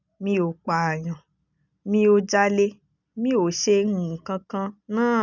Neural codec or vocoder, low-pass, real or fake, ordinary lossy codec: none; 7.2 kHz; real; none